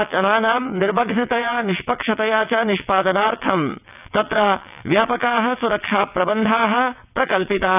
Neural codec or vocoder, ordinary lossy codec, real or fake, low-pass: vocoder, 22.05 kHz, 80 mel bands, WaveNeXt; none; fake; 3.6 kHz